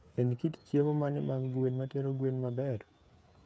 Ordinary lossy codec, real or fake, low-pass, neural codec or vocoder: none; fake; none; codec, 16 kHz, 8 kbps, FreqCodec, smaller model